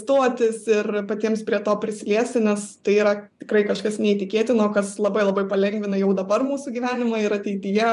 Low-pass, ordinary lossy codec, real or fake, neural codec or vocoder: 10.8 kHz; MP3, 96 kbps; fake; vocoder, 24 kHz, 100 mel bands, Vocos